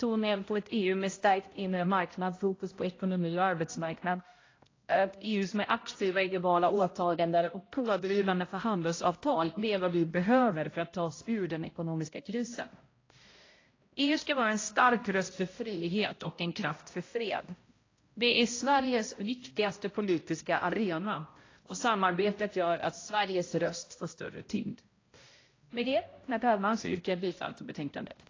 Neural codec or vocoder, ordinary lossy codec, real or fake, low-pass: codec, 16 kHz, 0.5 kbps, X-Codec, HuBERT features, trained on balanced general audio; AAC, 32 kbps; fake; 7.2 kHz